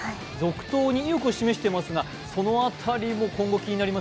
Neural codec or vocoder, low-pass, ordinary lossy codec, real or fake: none; none; none; real